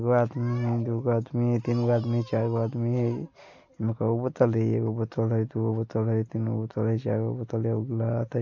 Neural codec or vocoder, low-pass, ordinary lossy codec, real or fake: none; 7.2 kHz; MP3, 48 kbps; real